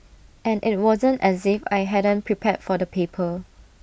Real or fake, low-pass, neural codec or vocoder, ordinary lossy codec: real; none; none; none